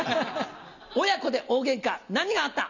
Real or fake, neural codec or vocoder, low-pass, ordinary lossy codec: real; none; 7.2 kHz; none